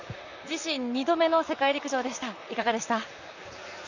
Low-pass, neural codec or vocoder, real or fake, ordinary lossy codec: 7.2 kHz; vocoder, 44.1 kHz, 128 mel bands, Pupu-Vocoder; fake; none